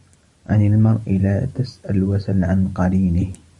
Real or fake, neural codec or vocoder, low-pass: fake; vocoder, 44.1 kHz, 128 mel bands every 512 samples, BigVGAN v2; 10.8 kHz